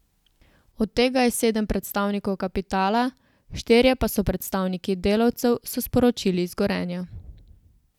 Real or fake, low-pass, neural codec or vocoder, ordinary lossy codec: real; 19.8 kHz; none; none